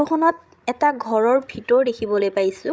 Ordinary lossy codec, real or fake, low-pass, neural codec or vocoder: none; fake; none; codec, 16 kHz, 16 kbps, FreqCodec, larger model